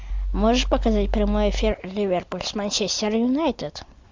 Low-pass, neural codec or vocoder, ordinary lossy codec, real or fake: 7.2 kHz; none; MP3, 64 kbps; real